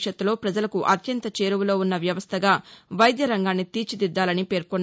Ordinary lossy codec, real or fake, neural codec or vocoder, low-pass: none; real; none; none